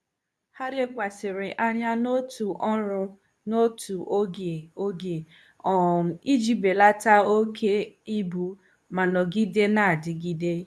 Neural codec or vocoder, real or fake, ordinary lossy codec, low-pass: codec, 24 kHz, 0.9 kbps, WavTokenizer, medium speech release version 2; fake; none; none